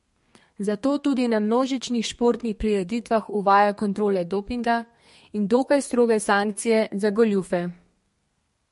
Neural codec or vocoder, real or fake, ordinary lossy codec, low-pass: codec, 32 kHz, 1.9 kbps, SNAC; fake; MP3, 48 kbps; 14.4 kHz